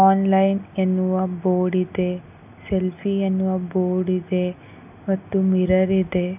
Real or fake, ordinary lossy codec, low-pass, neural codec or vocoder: real; none; 3.6 kHz; none